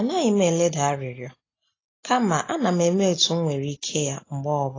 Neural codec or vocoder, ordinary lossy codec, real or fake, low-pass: none; AAC, 32 kbps; real; 7.2 kHz